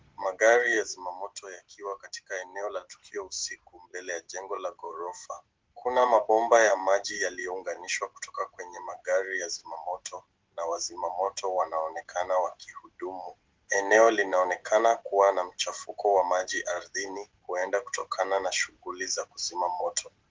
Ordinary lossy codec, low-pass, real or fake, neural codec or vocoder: Opus, 16 kbps; 7.2 kHz; real; none